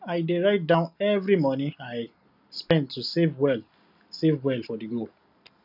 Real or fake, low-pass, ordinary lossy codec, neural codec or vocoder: real; 5.4 kHz; none; none